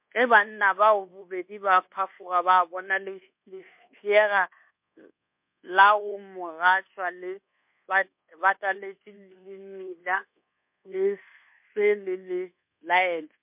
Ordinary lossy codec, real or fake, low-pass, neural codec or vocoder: MP3, 32 kbps; fake; 3.6 kHz; codec, 24 kHz, 1.2 kbps, DualCodec